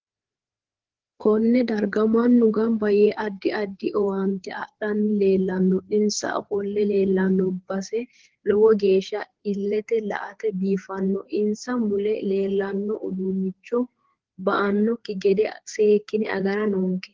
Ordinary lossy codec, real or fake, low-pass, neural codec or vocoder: Opus, 16 kbps; fake; 7.2 kHz; codec, 16 kHz, 4 kbps, FreqCodec, larger model